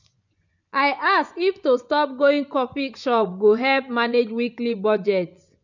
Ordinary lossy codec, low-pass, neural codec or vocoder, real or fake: none; 7.2 kHz; vocoder, 24 kHz, 100 mel bands, Vocos; fake